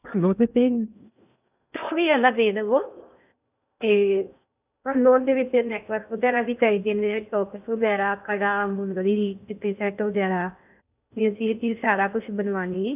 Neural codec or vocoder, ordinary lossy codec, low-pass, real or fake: codec, 16 kHz in and 24 kHz out, 0.6 kbps, FocalCodec, streaming, 2048 codes; none; 3.6 kHz; fake